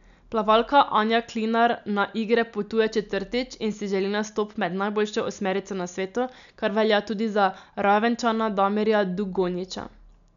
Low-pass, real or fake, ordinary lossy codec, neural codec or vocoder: 7.2 kHz; real; none; none